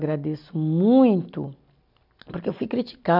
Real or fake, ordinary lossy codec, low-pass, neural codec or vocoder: real; none; 5.4 kHz; none